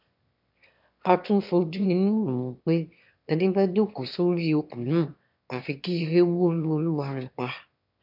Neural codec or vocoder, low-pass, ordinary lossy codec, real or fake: autoencoder, 22.05 kHz, a latent of 192 numbers a frame, VITS, trained on one speaker; 5.4 kHz; MP3, 48 kbps; fake